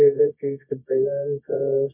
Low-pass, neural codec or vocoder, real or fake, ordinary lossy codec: 3.6 kHz; codec, 24 kHz, 0.9 kbps, WavTokenizer, medium music audio release; fake; MP3, 32 kbps